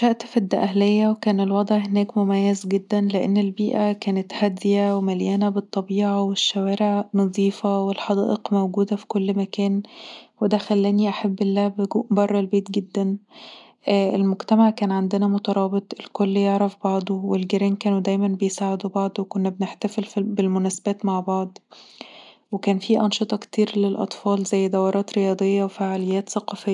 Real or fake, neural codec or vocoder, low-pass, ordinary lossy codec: real; none; 10.8 kHz; none